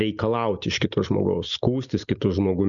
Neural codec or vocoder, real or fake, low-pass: none; real; 7.2 kHz